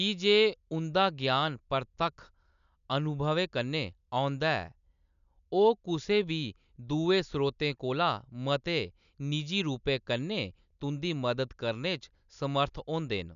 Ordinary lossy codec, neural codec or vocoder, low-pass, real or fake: none; none; 7.2 kHz; real